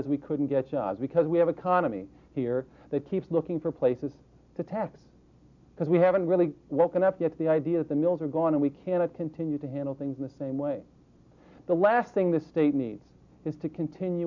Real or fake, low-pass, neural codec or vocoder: real; 7.2 kHz; none